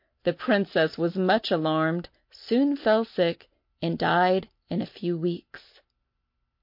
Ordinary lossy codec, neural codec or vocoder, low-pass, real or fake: MP3, 32 kbps; none; 5.4 kHz; real